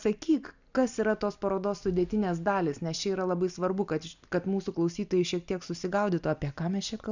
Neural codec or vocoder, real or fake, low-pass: none; real; 7.2 kHz